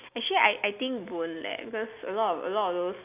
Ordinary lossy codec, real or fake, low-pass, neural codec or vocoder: none; real; 3.6 kHz; none